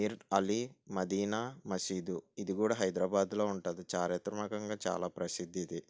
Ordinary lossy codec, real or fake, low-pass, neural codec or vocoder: none; real; none; none